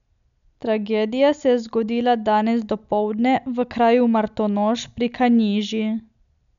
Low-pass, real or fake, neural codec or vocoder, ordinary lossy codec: 7.2 kHz; real; none; none